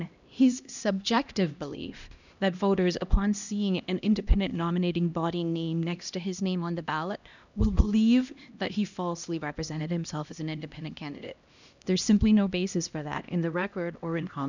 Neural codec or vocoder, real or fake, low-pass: codec, 16 kHz, 1 kbps, X-Codec, HuBERT features, trained on LibriSpeech; fake; 7.2 kHz